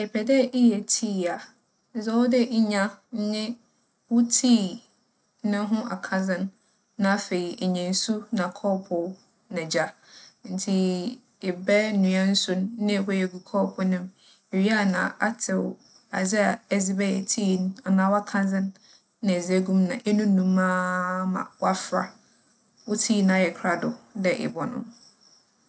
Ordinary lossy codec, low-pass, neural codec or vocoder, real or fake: none; none; none; real